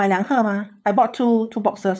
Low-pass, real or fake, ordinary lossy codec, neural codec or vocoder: none; fake; none; codec, 16 kHz, 8 kbps, FunCodec, trained on LibriTTS, 25 frames a second